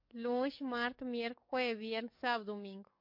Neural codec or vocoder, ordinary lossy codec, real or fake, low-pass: codec, 44.1 kHz, 7.8 kbps, DAC; MP3, 32 kbps; fake; 5.4 kHz